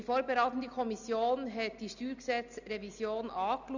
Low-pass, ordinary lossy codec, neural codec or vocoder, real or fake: 7.2 kHz; none; none; real